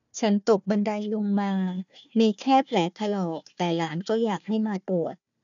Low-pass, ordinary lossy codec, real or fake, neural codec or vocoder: 7.2 kHz; none; fake; codec, 16 kHz, 1 kbps, FunCodec, trained on Chinese and English, 50 frames a second